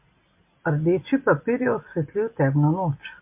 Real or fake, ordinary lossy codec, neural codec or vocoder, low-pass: real; MP3, 32 kbps; none; 3.6 kHz